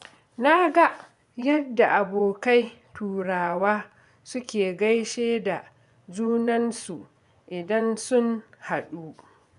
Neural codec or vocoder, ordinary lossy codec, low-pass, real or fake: vocoder, 24 kHz, 100 mel bands, Vocos; none; 10.8 kHz; fake